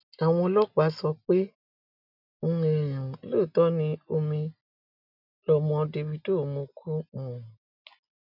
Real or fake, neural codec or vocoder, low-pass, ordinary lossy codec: real; none; 5.4 kHz; none